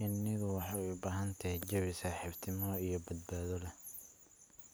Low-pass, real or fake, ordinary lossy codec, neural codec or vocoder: none; real; none; none